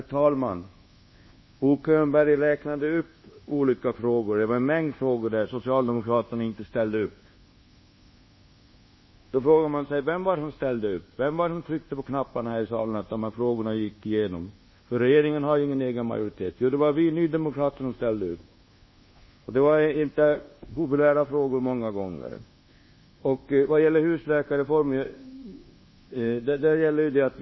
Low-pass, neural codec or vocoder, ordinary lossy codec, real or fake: 7.2 kHz; codec, 24 kHz, 1.2 kbps, DualCodec; MP3, 24 kbps; fake